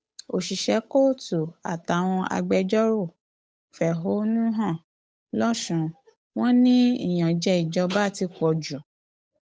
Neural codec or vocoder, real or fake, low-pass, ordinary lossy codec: codec, 16 kHz, 8 kbps, FunCodec, trained on Chinese and English, 25 frames a second; fake; none; none